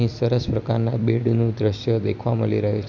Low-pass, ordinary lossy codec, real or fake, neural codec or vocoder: 7.2 kHz; none; real; none